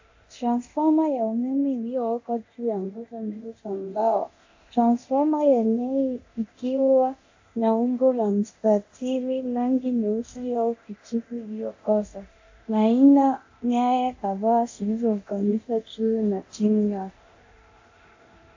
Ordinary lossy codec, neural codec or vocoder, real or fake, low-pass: AAC, 48 kbps; codec, 24 kHz, 0.9 kbps, DualCodec; fake; 7.2 kHz